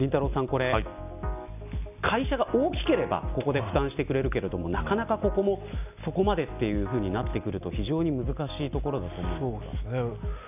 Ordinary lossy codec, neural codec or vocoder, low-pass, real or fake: none; none; 3.6 kHz; real